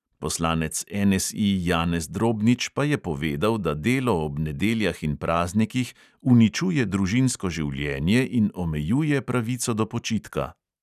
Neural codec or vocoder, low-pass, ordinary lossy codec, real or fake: none; 14.4 kHz; none; real